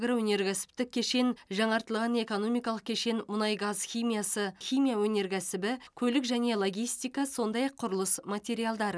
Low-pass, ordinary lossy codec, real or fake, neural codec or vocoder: none; none; real; none